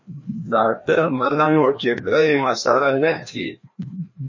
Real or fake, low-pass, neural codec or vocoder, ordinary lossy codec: fake; 7.2 kHz; codec, 16 kHz, 1 kbps, FreqCodec, larger model; MP3, 32 kbps